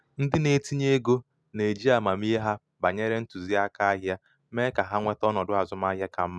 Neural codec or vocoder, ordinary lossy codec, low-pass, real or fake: none; none; none; real